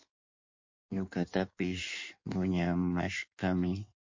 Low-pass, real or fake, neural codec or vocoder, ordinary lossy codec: 7.2 kHz; fake; codec, 16 kHz in and 24 kHz out, 1.1 kbps, FireRedTTS-2 codec; MP3, 48 kbps